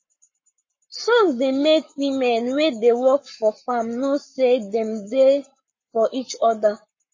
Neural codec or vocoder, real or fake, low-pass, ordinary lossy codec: codec, 44.1 kHz, 7.8 kbps, Pupu-Codec; fake; 7.2 kHz; MP3, 32 kbps